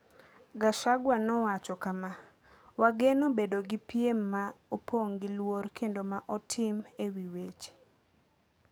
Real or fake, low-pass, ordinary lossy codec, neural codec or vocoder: fake; none; none; codec, 44.1 kHz, 7.8 kbps, DAC